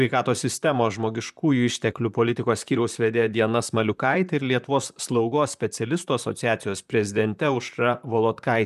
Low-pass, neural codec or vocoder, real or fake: 14.4 kHz; vocoder, 44.1 kHz, 128 mel bands, Pupu-Vocoder; fake